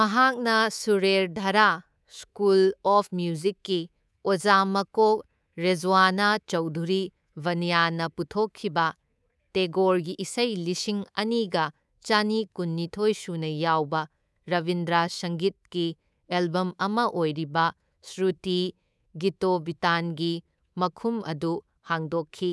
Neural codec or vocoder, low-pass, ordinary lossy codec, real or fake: codec, 24 kHz, 3.1 kbps, DualCodec; none; none; fake